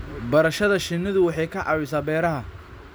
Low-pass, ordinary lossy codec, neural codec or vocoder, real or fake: none; none; none; real